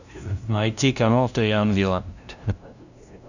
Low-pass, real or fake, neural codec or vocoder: 7.2 kHz; fake; codec, 16 kHz, 0.5 kbps, FunCodec, trained on LibriTTS, 25 frames a second